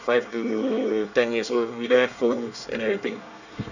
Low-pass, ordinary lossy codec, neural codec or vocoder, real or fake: 7.2 kHz; none; codec, 24 kHz, 1 kbps, SNAC; fake